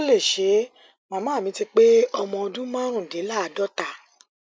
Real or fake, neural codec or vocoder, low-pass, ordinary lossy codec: real; none; none; none